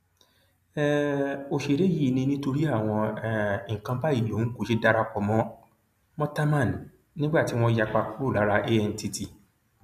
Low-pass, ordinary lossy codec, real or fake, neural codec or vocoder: 14.4 kHz; none; real; none